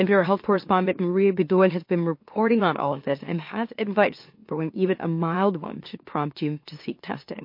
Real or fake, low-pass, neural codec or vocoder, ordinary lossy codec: fake; 5.4 kHz; autoencoder, 44.1 kHz, a latent of 192 numbers a frame, MeloTTS; MP3, 32 kbps